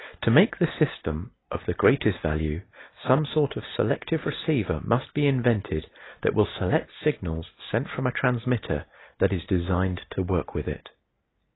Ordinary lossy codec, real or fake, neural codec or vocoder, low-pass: AAC, 16 kbps; real; none; 7.2 kHz